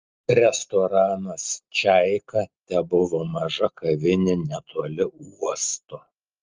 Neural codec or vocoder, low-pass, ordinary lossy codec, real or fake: none; 7.2 kHz; Opus, 24 kbps; real